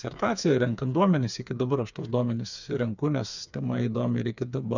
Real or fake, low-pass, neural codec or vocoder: fake; 7.2 kHz; codec, 16 kHz, 4 kbps, FreqCodec, smaller model